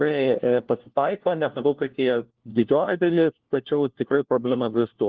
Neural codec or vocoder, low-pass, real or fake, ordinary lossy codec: codec, 16 kHz, 1 kbps, FunCodec, trained on LibriTTS, 50 frames a second; 7.2 kHz; fake; Opus, 16 kbps